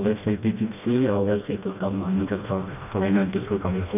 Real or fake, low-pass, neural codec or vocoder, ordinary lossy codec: fake; 3.6 kHz; codec, 16 kHz, 1 kbps, FreqCodec, smaller model; none